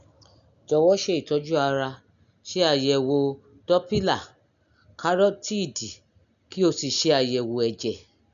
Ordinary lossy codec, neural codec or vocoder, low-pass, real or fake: none; none; 7.2 kHz; real